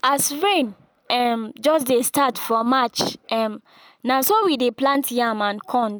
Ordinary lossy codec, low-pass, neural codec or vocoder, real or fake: none; none; none; real